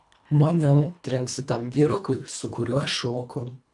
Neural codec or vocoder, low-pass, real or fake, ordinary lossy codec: codec, 24 kHz, 1.5 kbps, HILCodec; 10.8 kHz; fake; AAC, 64 kbps